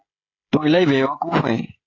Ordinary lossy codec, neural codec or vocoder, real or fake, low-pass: AAC, 32 kbps; codec, 16 kHz, 16 kbps, FreqCodec, smaller model; fake; 7.2 kHz